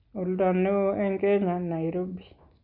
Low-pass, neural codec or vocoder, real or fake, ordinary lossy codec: 5.4 kHz; none; real; none